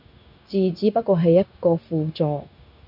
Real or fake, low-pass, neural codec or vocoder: fake; 5.4 kHz; codec, 16 kHz, 0.9 kbps, LongCat-Audio-Codec